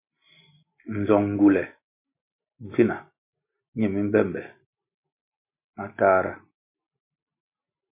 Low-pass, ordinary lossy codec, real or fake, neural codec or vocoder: 3.6 kHz; MP3, 24 kbps; real; none